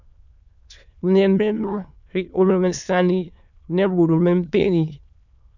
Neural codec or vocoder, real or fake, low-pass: autoencoder, 22.05 kHz, a latent of 192 numbers a frame, VITS, trained on many speakers; fake; 7.2 kHz